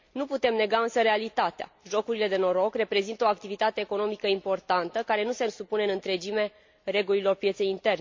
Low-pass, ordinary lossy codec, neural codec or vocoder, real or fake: 7.2 kHz; MP3, 48 kbps; none; real